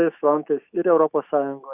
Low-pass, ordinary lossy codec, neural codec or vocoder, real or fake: 3.6 kHz; Opus, 64 kbps; autoencoder, 48 kHz, 128 numbers a frame, DAC-VAE, trained on Japanese speech; fake